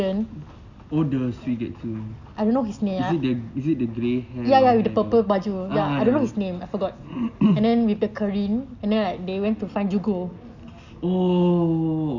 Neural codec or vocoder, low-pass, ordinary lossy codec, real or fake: none; 7.2 kHz; none; real